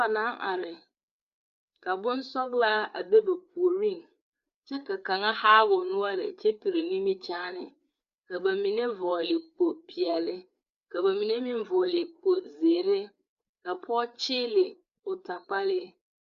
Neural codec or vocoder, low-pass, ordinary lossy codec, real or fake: codec, 16 kHz, 4 kbps, FreqCodec, larger model; 7.2 kHz; Opus, 64 kbps; fake